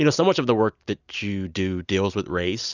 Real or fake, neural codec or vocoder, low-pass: real; none; 7.2 kHz